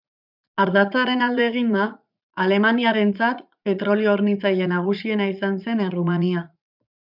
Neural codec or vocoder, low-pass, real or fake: vocoder, 44.1 kHz, 80 mel bands, Vocos; 5.4 kHz; fake